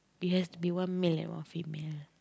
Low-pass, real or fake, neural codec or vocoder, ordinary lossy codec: none; real; none; none